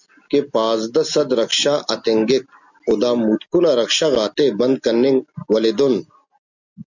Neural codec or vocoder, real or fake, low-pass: none; real; 7.2 kHz